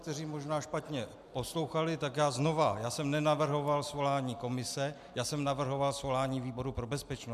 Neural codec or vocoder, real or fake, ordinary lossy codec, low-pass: none; real; MP3, 96 kbps; 14.4 kHz